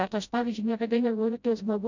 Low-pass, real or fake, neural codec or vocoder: 7.2 kHz; fake; codec, 16 kHz, 0.5 kbps, FreqCodec, smaller model